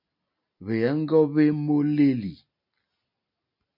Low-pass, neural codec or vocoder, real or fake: 5.4 kHz; none; real